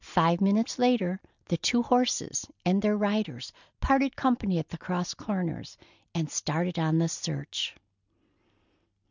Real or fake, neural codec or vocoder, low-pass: real; none; 7.2 kHz